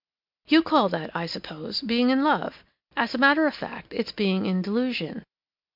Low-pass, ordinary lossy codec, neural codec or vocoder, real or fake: 5.4 kHz; MP3, 48 kbps; none; real